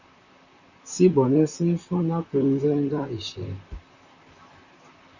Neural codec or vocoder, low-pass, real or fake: vocoder, 44.1 kHz, 128 mel bands, Pupu-Vocoder; 7.2 kHz; fake